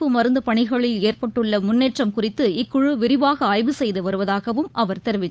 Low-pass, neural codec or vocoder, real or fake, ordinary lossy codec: none; codec, 16 kHz, 8 kbps, FunCodec, trained on Chinese and English, 25 frames a second; fake; none